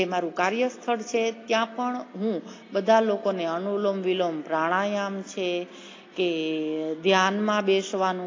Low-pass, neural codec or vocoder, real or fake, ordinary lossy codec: 7.2 kHz; none; real; AAC, 32 kbps